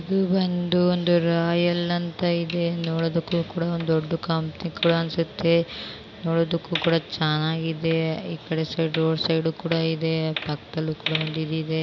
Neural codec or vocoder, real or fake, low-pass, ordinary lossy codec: none; real; 7.2 kHz; none